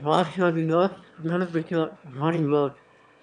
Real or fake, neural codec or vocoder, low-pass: fake; autoencoder, 22.05 kHz, a latent of 192 numbers a frame, VITS, trained on one speaker; 9.9 kHz